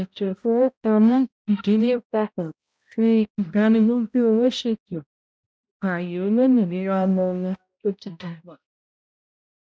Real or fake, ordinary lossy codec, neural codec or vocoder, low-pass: fake; none; codec, 16 kHz, 0.5 kbps, X-Codec, HuBERT features, trained on balanced general audio; none